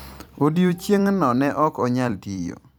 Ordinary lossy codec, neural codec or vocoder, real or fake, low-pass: none; vocoder, 44.1 kHz, 128 mel bands every 256 samples, BigVGAN v2; fake; none